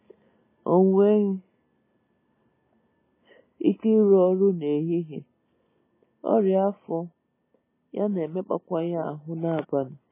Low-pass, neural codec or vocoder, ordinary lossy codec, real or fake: 3.6 kHz; none; MP3, 16 kbps; real